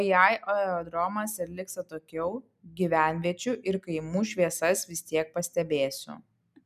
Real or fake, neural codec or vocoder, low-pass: real; none; 14.4 kHz